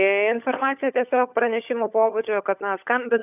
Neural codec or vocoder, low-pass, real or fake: codec, 16 kHz, 16 kbps, FunCodec, trained on LibriTTS, 50 frames a second; 3.6 kHz; fake